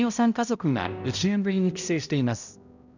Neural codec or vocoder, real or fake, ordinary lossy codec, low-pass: codec, 16 kHz, 0.5 kbps, X-Codec, HuBERT features, trained on balanced general audio; fake; none; 7.2 kHz